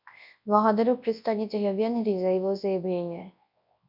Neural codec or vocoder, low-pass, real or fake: codec, 24 kHz, 0.9 kbps, WavTokenizer, large speech release; 5.4 kHz; fake